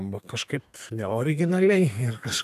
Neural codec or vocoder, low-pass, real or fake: codec, 32 kHz, 1.9 kbps, SNAC; 14.4 kHz; fake